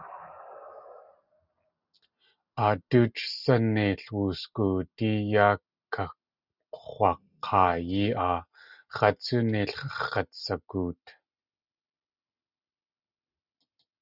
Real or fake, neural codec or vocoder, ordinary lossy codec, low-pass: real; none; Opus, 64 kbps; 5.4 kHz